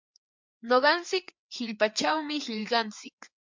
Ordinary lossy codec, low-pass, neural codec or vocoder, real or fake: MP3, 64 kbps; 7.2 kHz; codec, 16 kHz, 4 kbps, FreqCodec, larger model; fake